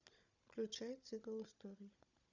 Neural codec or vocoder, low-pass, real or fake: codec, 16 kHz, 16 kbps, FunCodec, trained on Chinese and English, 50 frames a second; 7.2 kHz; fake